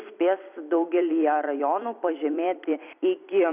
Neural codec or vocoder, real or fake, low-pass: vocoder, 44.1 kHz, 128 mel bands every 256 samples, BigVGAN v2; fake; 3.6 kHz